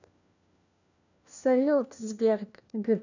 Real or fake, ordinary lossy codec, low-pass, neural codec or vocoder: fake; none; 7.2 kHz; codec, 16 kHz, 1 kbps, FunCodec, trained on LibriTTS, 50 frames a second